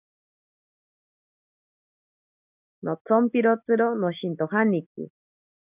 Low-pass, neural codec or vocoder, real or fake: 3.6 kHz; none; real